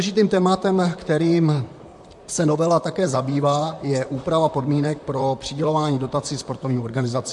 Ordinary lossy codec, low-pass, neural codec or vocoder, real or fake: MP3, 64 kbps; 10.8 kHz; vocoder, 44.1 kHz, 128 mel bands, Pupu-Vocoder; fake